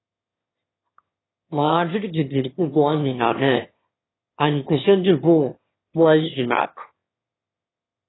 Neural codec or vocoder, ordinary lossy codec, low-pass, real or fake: autoencoder, 22.05 kHz, a latent of 192 numbers a frame, VITS, trained on one speaker; AAC, 16 kbps; 7.2 kHz; fake